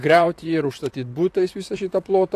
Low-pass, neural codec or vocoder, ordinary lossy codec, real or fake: 14.4 kHz; vocoder, 44.1 kHz, 128 mel bands, Pupu-Vocoder; AAC, 64 kbps; fake